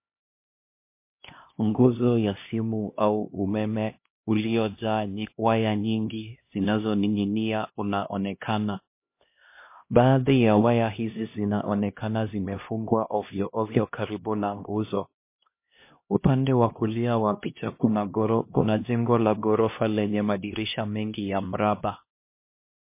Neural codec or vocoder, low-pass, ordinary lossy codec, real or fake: codec, 16 kHz, 1 kbps, X-Codec, HuBERT features, trained on LibriSpeech; 3.6 kHz; MP3, 24 kbps; fake